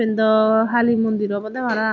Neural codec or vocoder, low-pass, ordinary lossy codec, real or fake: none; 7.2 kHz; none; real